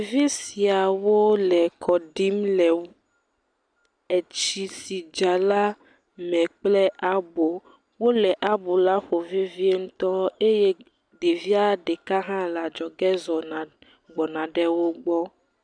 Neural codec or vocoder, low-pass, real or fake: none; 9.9 kHz; real